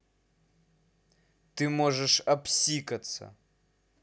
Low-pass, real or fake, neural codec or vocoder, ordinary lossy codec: none; real; none; none